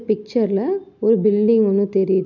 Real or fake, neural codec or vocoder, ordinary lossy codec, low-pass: real; none; none; 7.2 kHz